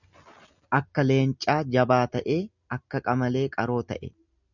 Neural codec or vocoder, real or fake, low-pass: none; real; 7.2 kHz